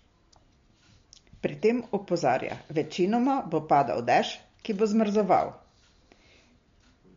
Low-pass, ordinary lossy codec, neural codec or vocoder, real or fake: 7.2 kHz; MP3, 48 kbps; none; real